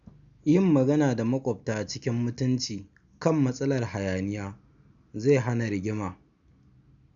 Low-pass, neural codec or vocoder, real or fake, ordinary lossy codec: 7.2 kHz; none; real; none